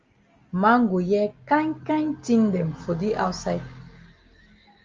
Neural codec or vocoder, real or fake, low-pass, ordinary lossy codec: none; real; 7.2 kHz; Opus, 32 kbps